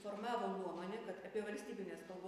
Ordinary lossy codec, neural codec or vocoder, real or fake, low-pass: AAC, 96 kbps; none; real; 14.4 kHz